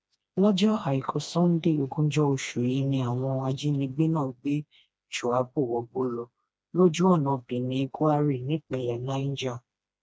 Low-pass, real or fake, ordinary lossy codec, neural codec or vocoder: none; fake; none; codec, 16 kHz, 2 kbps, FreqCodec, smaller model